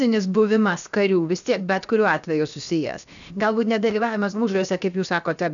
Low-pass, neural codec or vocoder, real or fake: 7.2 kHz; codec, 16 kHz, 0.7 kbps, FocalCodec; fake